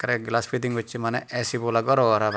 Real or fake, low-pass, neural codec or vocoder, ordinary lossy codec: real; none; none; none